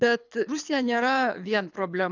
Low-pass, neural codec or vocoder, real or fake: 7.2 kHz; codec, 24 kHz, 6 kbps, HILCodec; fake